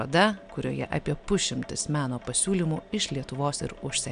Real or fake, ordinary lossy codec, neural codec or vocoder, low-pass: real; MP3, 96 kbps; none; 9.9 kHz